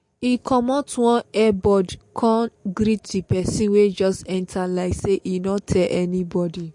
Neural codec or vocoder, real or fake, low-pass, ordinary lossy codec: none; real; 10.8 kHz; MP3, 48 kbps